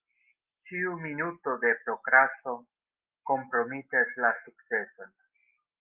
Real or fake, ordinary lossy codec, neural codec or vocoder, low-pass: real; Opus, 24 kbps; none; 3.6 kHz